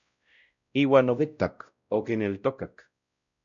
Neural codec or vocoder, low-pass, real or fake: codec, 16 kHz, 0.5 kbps, X-Codec, WavLM features, trained on Multilingual LibriSpeech; 7.2 kHz; fake